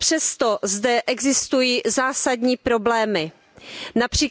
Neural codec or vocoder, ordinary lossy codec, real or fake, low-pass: none; none; real; none